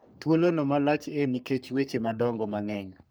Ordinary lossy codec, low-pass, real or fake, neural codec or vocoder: none; none; fake; codec, 44.1 kHz, 3.4 kbps, Pupu-Codec